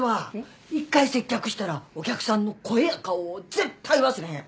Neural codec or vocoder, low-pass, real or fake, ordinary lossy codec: none; none; real; none